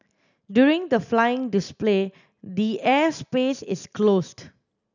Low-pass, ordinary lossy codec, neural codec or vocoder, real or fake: 7.2 kHz; none; none; real